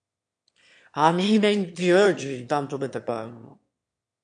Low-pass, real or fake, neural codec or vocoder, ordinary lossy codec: 9.9 kHz; fake; autoencoder, 22.05 kHz, a latent of 192 numbers a frame, VITS, trained on one speaker; MP3, 64 kbps